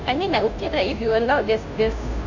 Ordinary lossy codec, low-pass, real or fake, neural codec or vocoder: none; 7.2 kHz; fake; codec, 16 kHz, 0.5 kbps, FunCodec, trained on Chinese and English, 25 frames a second